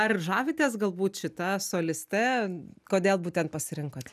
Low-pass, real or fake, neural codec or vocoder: 14.4 kHz; real; none